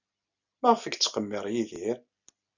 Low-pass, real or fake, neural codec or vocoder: 7.2 kHz; real; none